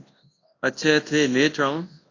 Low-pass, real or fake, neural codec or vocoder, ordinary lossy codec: 7.2 kHz; fake; codec, 24 kHz, 0.9 kbps, WavTokenizer, large speech release; AAC, 32 kbps